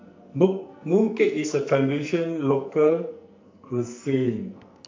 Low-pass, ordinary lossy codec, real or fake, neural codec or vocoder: 7.2 kHz; MP3, 64 kbps; fake; codec, 44.1 kHz, 2.6 kbps, SNAC